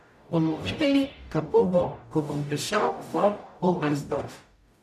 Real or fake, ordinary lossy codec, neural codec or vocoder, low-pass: fake; none; codec, 44.1 kHz, 0.9 kbps, DAC; 14.4 kHz